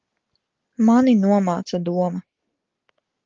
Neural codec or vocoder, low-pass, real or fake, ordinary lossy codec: none; 7.2 kHz; real; Opus, 32 kbps